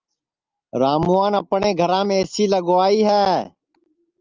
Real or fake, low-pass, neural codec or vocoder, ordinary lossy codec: real; 7.2 kHz; none; Opus, 32 kbps